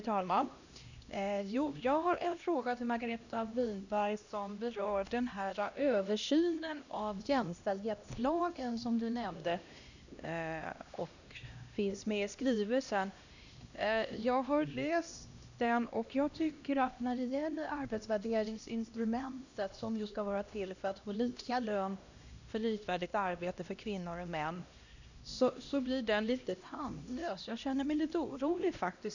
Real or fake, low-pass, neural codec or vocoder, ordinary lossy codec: fake; 7.2 kHz; codec, 16 kHz, 1 kbps, X-Codec, HuBERT features, trained on LibriSpeech; none